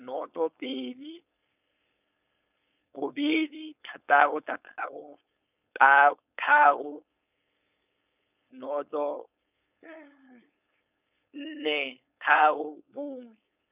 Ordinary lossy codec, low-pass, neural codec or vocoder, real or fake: none; 3.6 kHz; codec, 16 kHz, 4.8 kbps, FACodec; fake